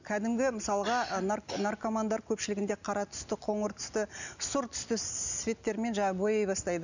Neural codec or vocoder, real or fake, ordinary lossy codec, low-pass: none; real; none; 7.2 kHz